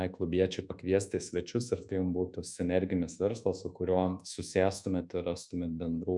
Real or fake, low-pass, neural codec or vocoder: fake; 10.8 kHz; codec, 24 kHz, 1.2 kbps, DualCodec